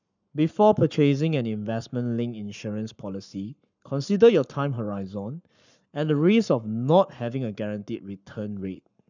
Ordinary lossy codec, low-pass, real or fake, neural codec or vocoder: none; 7.2 kHz; fake; codec, 44.1 kHz, 7.8 kbps, Pupu-Codec